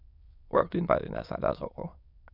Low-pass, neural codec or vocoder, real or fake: 5.4 kHz; autoencoder, 22.05 kHz, a latent of 192 numbers a frame, VITS, trained on many speakers; fake